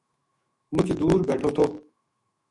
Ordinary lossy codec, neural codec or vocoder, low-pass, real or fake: MP3, 48 kbps; autoencoder, 48 kHz, 128 numbers a frame, DAC-VAE, trained on Japanese speech; 10.8 kHz; fake